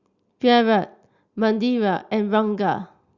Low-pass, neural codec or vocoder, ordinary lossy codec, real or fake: 7.2 kHz; none; Opus, 64 kbps; real